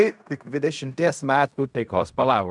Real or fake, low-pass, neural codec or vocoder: fake; 10.8 kHz; codec, 16 kHz in and 24 kHz out, 0.4 kbps, LongCat-Audio-Codec, fine tuned four codebook decoder